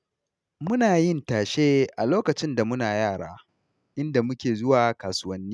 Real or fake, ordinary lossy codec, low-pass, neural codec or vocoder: real; none; none; none